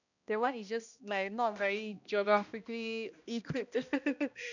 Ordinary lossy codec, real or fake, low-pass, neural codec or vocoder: none; fake; 7.2 kHz; codec, 16 kHz, 1 kbps, X-Codec, HuBERT features, trained on balanced general audio